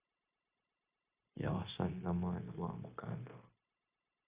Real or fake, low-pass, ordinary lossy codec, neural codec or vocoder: fake; 3.6 kHz; Opus, 64 kbps; codec, 16 kHz, 0.9 kbps, LongCat-Audio-Codec